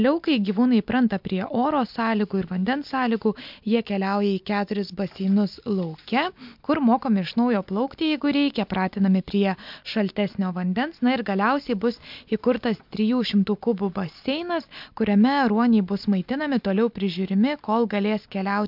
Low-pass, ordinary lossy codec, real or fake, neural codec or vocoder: 5.4 kHz; MP3, 48 kbps; real; none